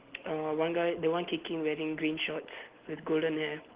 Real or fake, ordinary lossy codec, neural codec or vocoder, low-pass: real; Opus, 16 kbps; none; 3.6 kHz